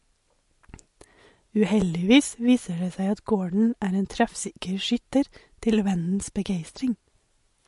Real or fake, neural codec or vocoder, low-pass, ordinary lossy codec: real; none; 14.4 kHz; MP3, 48 kbps